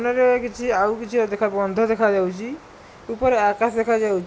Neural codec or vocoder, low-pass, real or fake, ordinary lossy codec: none; none; real; none